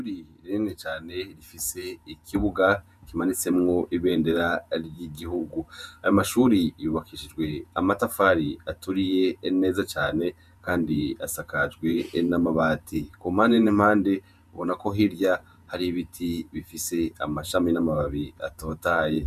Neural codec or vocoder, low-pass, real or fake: vocoder, 48 kHz, 128 mel bands, Vocos; 14.4 kHz; fake